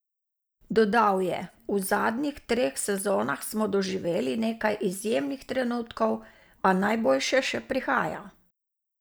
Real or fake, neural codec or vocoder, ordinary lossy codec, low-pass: real; none; none; none